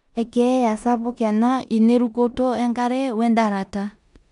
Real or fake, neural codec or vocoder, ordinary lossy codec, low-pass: fake; codec, 16 kHz in and 24 kHz out, 0.9 kbps, LongCat-Audio-Codec, four codebook decoder; none; 10.8 kHz